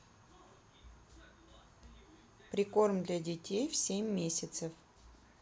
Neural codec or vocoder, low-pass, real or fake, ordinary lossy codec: none; none; real; none